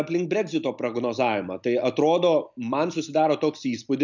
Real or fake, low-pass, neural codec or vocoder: real; 7.2 kHz; none